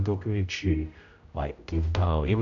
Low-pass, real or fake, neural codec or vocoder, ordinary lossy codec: 7.2 kHz; fake; codec, 16 kHz, 0.5 kbps, X-Codec, HuBERT features, trained on general audio; AAC, 64 kbps